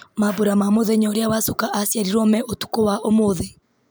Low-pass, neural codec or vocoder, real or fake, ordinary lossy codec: none; none; real; none